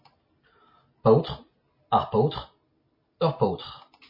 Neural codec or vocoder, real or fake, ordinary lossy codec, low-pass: none; real; MP3, 24 kbps; 5.4 kHz